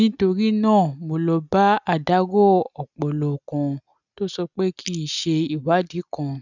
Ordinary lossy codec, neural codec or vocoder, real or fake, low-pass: none; none; real; 7.2 kHz